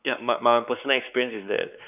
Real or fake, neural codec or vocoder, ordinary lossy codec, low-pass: fake; codec, 16 kHz, 4 kbps, X-Codec, WavLM features, trained on Multilingual LibriSpeech; none; 3.6 kHz